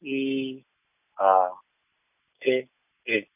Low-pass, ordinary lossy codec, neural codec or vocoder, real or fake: 3.6 kHz; none; none; real